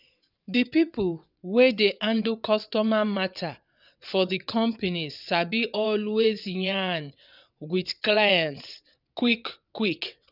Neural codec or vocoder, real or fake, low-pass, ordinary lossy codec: vocoder, 22.05 kHz, 80 mel bands, WaveNeXt; fake; 5.4 kHz; none